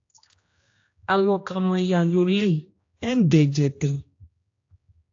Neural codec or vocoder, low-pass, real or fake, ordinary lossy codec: codec, 16 kHz, 1 kbps, X-Codec, HuBERT features, trained on general audio; 7.2 kHz; fake; AAC, 48 kbps